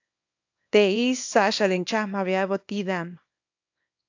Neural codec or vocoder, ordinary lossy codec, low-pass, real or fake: codec, 24 kHz, 0.9 kbps, WavTokenizer, small release; AAC, 48 kbps; 7.2 kHz; fake